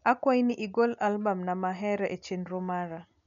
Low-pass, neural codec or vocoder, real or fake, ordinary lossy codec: 7.2 kHz; none; real; none